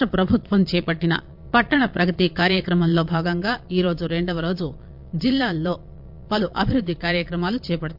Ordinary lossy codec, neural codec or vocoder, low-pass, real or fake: MP3, 48 kbps; vocoder, 22.05 kHz, 80 mel bands, Vocos; 5.4 kHz; fake